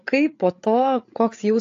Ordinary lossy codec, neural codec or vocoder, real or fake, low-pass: AAC, 48 kbps; codec, 16 kHz, 8 kbps, FreqCodec, larger model; fake; 7.2 kHz